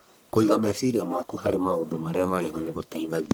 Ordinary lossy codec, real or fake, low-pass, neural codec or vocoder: none; fake; none; codec, 44.1 kHz, 1.7 kbps, Pupu-Codec